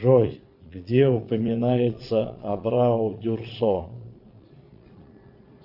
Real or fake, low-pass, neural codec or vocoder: fake; 5.4 kHz; vocoder, 22.05 kHz, 80 mel bands, Vocos